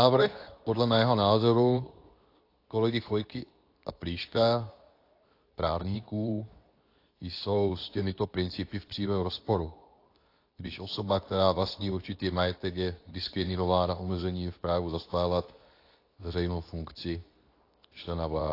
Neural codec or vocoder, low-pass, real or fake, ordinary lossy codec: codec, 24 kHz, 0.9 kbps, WavTokenizer, medium speech release version 2; 5.4 kHz; fake; AAC, 32 kbps